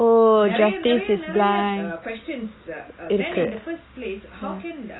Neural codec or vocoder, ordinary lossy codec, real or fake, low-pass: none; AAC, 16 kbps; real; 7.2 kHz